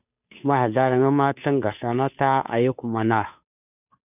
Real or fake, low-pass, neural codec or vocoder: fake; 3.6 kHz; codec, 16 kHz, 2 kbps, FunCodec, trained on Chinese and English, 25 frames a second